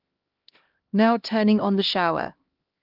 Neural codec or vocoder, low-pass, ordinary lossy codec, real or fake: codec, 16 kHz, 1 kbps, X-Codec, HuBERT features, trained on LibriSpeech; 5.4 kHz; Opus, 32 kbps; fake